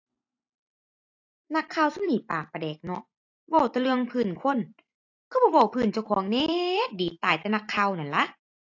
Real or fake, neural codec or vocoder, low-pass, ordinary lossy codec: fake; vocoder, 44.1 kHz, 128 mel bands every 256 samples, BigVGAN v2; 7.2 kHz; AAC, 48 kbps